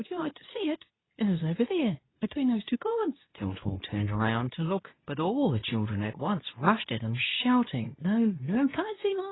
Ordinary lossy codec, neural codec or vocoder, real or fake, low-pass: AAC, 16 kbps; codec, 24 kHz, 0.9 kbps, WavTokenizer, medium speech release version 2; fake; 7.2 kHz